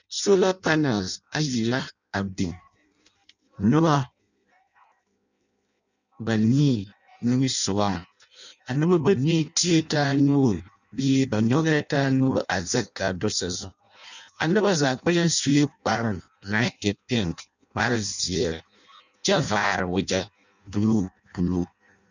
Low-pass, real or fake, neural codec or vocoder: 7.2 kHz; fake; codec, 16 kHz in and 24 kHz out, 0.6 kbps, FireRedTTS-2 codec